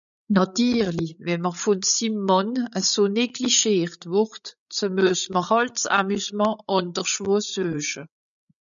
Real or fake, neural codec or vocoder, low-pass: fake; codec, 16 kHz, 8 kbps, FreqCodec, larger model; 7.2 kHz